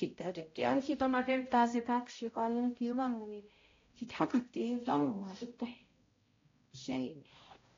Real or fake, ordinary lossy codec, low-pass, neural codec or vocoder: fake; AAC, 32 kbps; 7.2 kHz; codec, 16 kHz, 0.5 kbps, X-Codec, HuBERT features, trained on balanced general audio